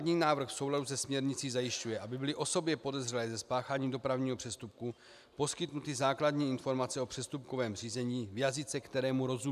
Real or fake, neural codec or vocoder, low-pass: real; none; 14.4 kHz